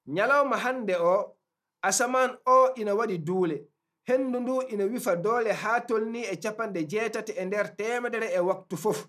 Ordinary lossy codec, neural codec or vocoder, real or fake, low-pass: none; none; real; 14.4 kHz